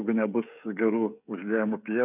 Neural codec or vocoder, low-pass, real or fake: codec, 16 kHz, 16 kbps, FreqCodec, smaller model; 3.6 kHz; fake